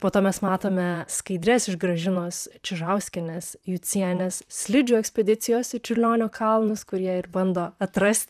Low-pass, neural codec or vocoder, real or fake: 14.4 kHz; vocoder, 44.1 kHz, 128 mel bands, Pupu-Vocoder; fake